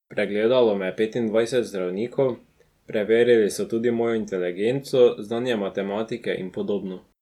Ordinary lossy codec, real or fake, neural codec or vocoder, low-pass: none; real; none; 19.8 kHz